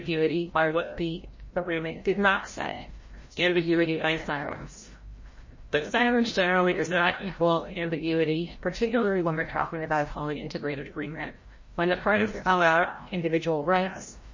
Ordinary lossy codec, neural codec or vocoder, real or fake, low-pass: MP3, 32 kbps; codec, 16 kHz, 0.5 kbps, FreqCodec, larger model; fake; 7.2 kHz